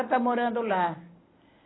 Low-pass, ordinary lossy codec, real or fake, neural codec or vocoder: 7.2 kHz; AAC, 16 kbps; real; none